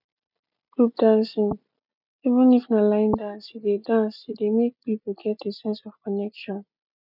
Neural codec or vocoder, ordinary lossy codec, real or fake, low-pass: none; none; real; 5.4 kHz